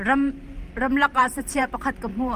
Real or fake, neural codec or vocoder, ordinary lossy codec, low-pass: fake; vocoder, 44.1 kHz, 128 mel bands every 512 samples, BigVGAN v2; Opus, 24 kbps; 14.4 kHz